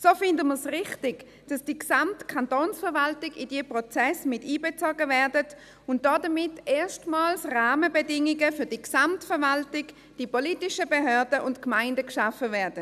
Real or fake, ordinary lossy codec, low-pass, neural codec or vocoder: real; none; 14.4 kHz; none